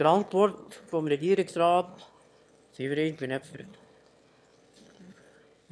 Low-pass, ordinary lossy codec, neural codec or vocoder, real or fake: none; none; autoencoder, 22.05 kHz, a latent of 192 numbers a frame, VITS, trained on one speaker; fake